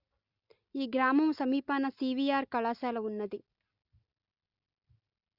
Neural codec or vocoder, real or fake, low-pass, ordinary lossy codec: none; real; 5.4 kHz; Opus, 64 kbps